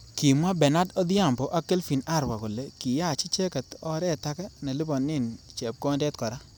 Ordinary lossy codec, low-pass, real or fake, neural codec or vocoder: none; none; real; none